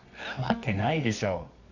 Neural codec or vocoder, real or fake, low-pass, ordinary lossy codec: codec, 24 kHz, 0.9 kbps, WavTokenizer, medium music audio release; fake; 7.2 kHz; none